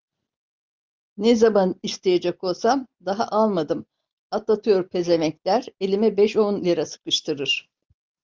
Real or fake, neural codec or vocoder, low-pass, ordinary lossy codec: real; none; 7.2 kHz; Opus, 24 kbps